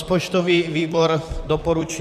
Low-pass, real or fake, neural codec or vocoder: 14.4 kHz; fake; vocoder, 44.1 kHz, 128 mel bands, Pupu-Vocoder